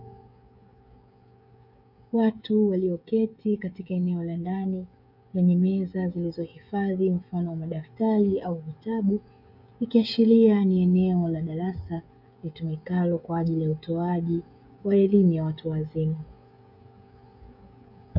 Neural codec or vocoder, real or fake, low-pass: codec, 16 kHz, 16 kbps, FreqCodec, smaller model; fake; 5.4 kHz